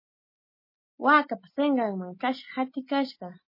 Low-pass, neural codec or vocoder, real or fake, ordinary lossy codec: 5.4 kHz; none; real; MP3, 24 kbps